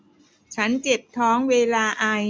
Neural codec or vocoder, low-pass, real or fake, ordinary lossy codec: none; none; real; none